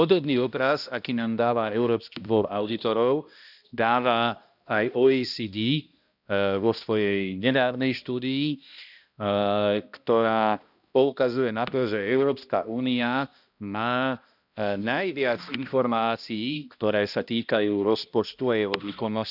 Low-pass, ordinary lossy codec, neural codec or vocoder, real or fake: 5.4 kHz; none; codec, 16 kHz, 1 kbps, X-Codec, HuBERT features, trained on balanced general audio; fake